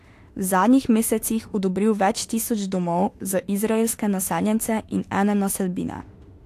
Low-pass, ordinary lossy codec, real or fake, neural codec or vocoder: 14.4 kHz; AAC, 64 kbps; fake; autoencoder, 48 kHz, 32 numbers a frame, DAC-VAE, trained on Japanese speech